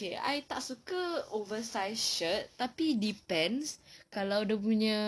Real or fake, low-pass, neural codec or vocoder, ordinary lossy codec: real; none; none; none